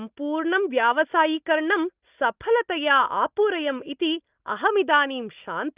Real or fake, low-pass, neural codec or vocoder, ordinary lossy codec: real; 3.6 kHz; none; Opus, 24 kbps